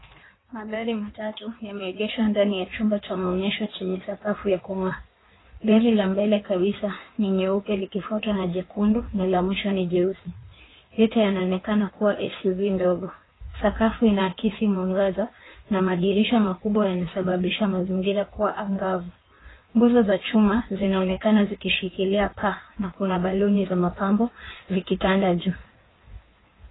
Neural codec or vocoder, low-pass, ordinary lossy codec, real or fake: codec, 16 kHz in and 24 kHz out, 1.1 kbps, FireRedTTS-2 codec; 7.2 kHz; AAC, 16 kbps; fake